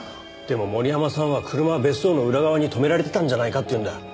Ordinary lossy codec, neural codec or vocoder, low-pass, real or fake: none; none; none; real